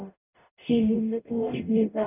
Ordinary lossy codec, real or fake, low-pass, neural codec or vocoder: none; fake; 3.6 kHz; codec, 44.1 kHz, 0.9 kbps, DAC